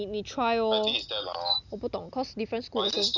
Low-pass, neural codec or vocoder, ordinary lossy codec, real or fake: 7.2 kHz; none; none; real